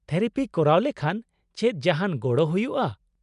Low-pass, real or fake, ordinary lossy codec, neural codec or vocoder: 10.8 kHz; real; MP3, 96 kbps; none